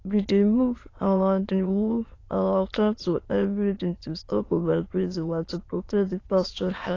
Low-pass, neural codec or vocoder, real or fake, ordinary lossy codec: 7.2 kHz; autoencoder, 22.05 kHz, a latent of 192 numbers a frame, VITS, trained on many speakers; fake; AAC, 32 kbps